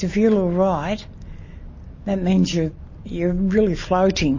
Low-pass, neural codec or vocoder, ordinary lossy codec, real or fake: 7.2 kHz; none; MP3, 32 kbps; real